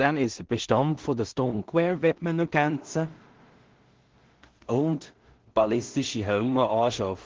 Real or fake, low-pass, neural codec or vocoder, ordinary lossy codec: fake; 7.2 kHz; codec, 16 kHz in and 24 kHz out, 0.4 kbps, LongCat-Audio-Codec, two codebook decoder; Opus, 16 kbps